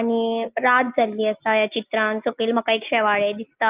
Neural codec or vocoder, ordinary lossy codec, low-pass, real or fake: none; Opus, 32 kbps; 3.6 kHz; real